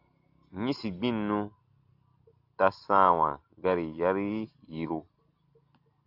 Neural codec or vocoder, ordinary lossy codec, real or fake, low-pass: none; AAC, 48 kbps; real; 5.4 kHz